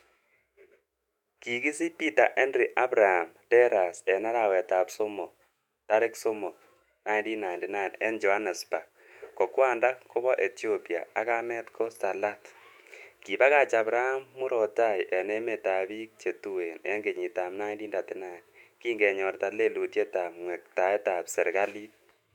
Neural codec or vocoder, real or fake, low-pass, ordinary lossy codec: autoencoder, 48 kHz, 128 numbers a frame, DAC-VAE, trained on Japanese speech; fake; 19.8 kHz; MP3, 96 kbps